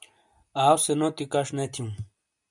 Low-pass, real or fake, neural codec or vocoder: 10.8 kHz; real; none